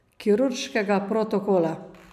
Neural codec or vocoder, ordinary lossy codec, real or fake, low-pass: none; none; real; 14.4 kHz